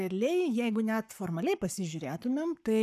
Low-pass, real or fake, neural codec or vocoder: 14.4 kHz; fake; codec, 44.1 kHz, 7.8 kbps, Pupu-Codec